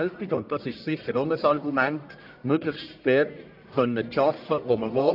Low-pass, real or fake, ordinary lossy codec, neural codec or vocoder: 5.4 kHz; fake; none; codec, 44.1 kHz, 1.7 kbps, Pupu-Codec